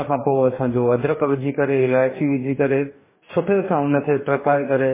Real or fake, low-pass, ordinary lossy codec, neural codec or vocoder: fake; 3.6 kHz; MP3, 16 kbps; codec, 44.1 kHz, 2.6 kbps, DAC